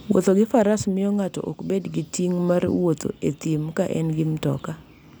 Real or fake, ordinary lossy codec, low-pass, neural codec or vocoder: real; none; none; none